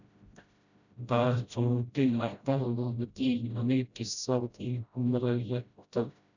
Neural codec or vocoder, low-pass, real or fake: codec, 16 kHz, 0.5 kbps, FreqCodec, smaller model; 7.2 kHz; fake